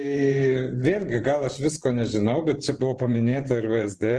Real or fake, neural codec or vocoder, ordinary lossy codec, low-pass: fake; vocoder, 24 kHz, 100 mel bands, Vocos; Opus, 16 kbps; 10.8 kHz